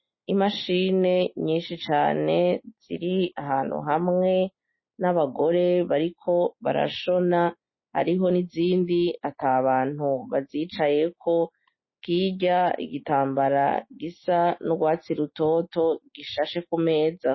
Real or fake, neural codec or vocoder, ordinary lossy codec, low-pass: real; none; MP3, 24 kbps; 7.2 kHz